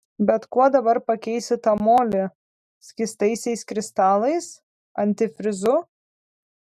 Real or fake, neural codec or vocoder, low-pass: real; none; 14.4 kHz